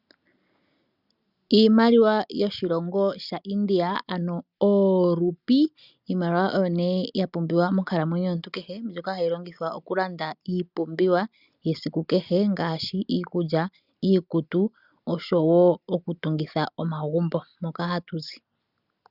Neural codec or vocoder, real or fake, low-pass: none; real; 5.4 kHz